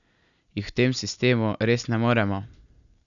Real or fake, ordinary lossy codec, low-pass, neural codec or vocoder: real; none; 7.2 kHz; none